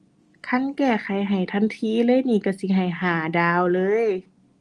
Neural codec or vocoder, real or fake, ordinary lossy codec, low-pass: none; real; Opus, 24 kbps; 10.8 kHz